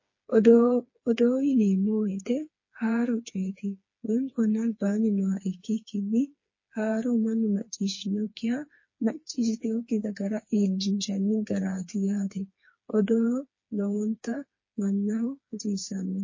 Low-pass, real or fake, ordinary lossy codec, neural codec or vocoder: 7.2 kHz; fake; MP3, 32 kbps; codec, 16 kHz, 4 kbps, FreqCodec, smaller model